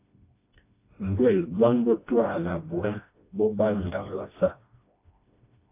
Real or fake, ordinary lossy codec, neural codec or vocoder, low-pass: fake; AAC, 24 kbps; codec, 16 kHz, 1 kbps, FreqCodec, smaller model; 3.6 kHz